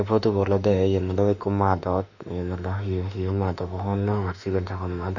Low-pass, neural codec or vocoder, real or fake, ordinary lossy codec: 7.2 kHz; autoencoder, 48 kHz, 32 numbers a frame, DAC-VAE, trained on Japanese speech; fake; none